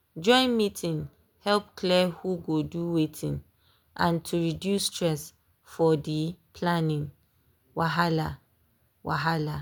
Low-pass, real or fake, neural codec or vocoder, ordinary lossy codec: none; real; none; none